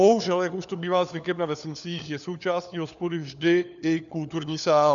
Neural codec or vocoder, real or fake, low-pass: codec, 16 kHz, 4 kbps, FunCodec, trained on LibriTTS, 50 frames a second; fake; 7.2 kHz